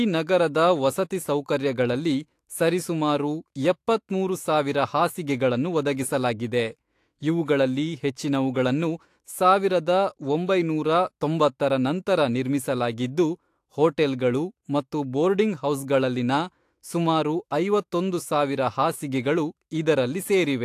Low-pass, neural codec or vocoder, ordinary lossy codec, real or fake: 14.4 kHz; autoencoder, 48 kHz, 128 numbers a frame, DAC-VAE, trained on Japanese speech; AAC, 64 kbps; fake